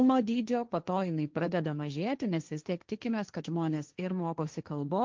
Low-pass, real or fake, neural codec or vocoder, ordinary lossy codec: 7.2 kHz; fake; codec, 16 kHz, 1.1 kbps, Voila-Tokenizer; Opus, 32 kbps